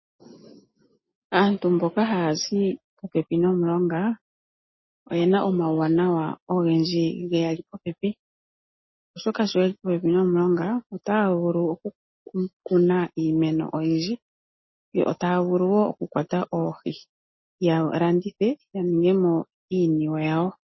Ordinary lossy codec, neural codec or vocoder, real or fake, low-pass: MP3, 24 kbps; none; real; 7.2 kHz